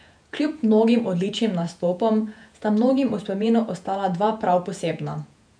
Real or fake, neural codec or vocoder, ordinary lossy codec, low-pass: fake; vocoder, 48 kHz, 128 mel bands, Vocos; none; 9.9 kHz